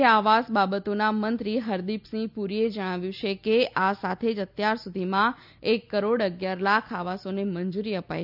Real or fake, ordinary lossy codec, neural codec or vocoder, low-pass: real; none; none; 5.4 kHz